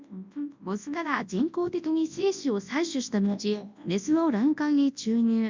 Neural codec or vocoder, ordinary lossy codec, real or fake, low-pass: codec, 24 kHz, 0.9 kbps, WavTokenizer, large speech release; MP3, 64 kbps; fake; 7.2 kHz